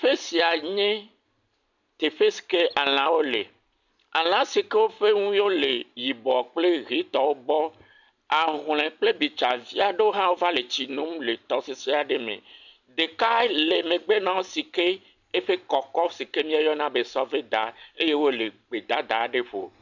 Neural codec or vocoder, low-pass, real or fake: none; 7.2 kHz; real